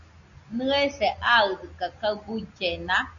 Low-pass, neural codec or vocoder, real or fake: 7.2 kHz; none; real